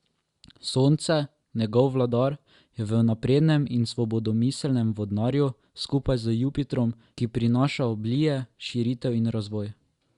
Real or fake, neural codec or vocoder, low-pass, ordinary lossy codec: real; none; 10.8 kHz; Opus, 64 kbps